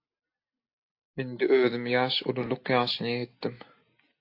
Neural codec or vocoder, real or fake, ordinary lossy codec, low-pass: none; real; AAC, 32 kbps; 5.4 kHz